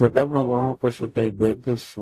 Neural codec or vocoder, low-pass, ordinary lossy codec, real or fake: codec, 44.1 kHz, 0.9 kbps, DAC; 14.4 kHz; AAC, 64 kbps; fake